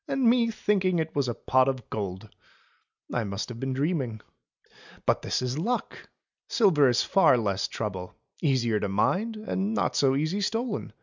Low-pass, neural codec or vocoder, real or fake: 7.2 kHz; none; real